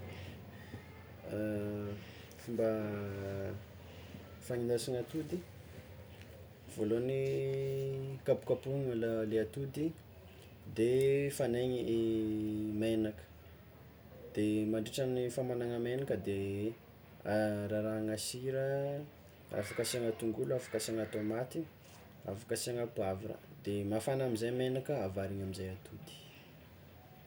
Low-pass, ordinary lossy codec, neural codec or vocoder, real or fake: none; none; none; real